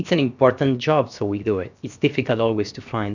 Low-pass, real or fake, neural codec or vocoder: 7.2 kHz; fake; codec, 16 kHz, 0.7 kbps, FocalCodec